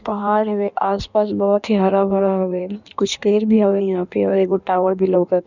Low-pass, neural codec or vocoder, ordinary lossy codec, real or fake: 7.2 kHz; codec, 16 kHz in and 24 kHz out, 1.1 kbps, FireRedTTS-2 codec; none; fake